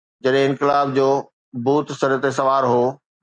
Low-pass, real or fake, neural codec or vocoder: 9.9 kHz; real; none